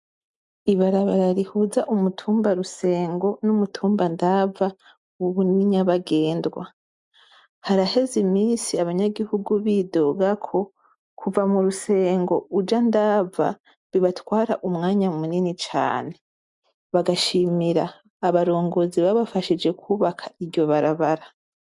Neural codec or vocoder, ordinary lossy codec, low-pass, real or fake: none; MP3, 64 kbps; 10.8 kHz; real